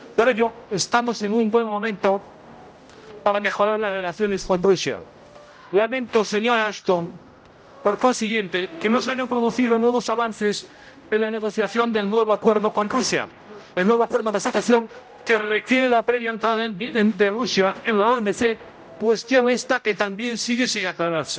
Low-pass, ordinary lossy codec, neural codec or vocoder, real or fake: none; none; codec, 16 kHz, 0.5 kbps, X-Codec, HuBERT features, trained on general audio; fake